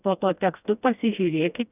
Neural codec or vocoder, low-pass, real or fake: codec, 16 kHz, 1 kbps, FreqCodec, smaller model; 3.6 kHz; fake